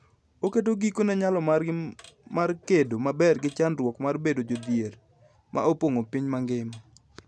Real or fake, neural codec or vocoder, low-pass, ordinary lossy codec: real; none; none; none